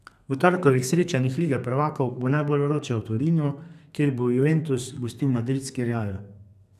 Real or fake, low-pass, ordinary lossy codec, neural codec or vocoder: fake; 14.4 kHz; none; codec, 32 kHz, 1.9 kbps, SNAC